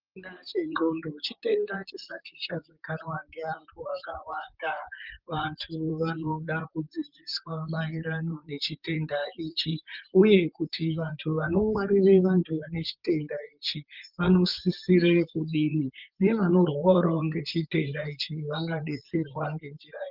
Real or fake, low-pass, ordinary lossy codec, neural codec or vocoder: fake; 5.4 kHz; Opus, 24 kbps; vocoder, 44.1 kHz, 128 mel bands, Pupu-Vocoder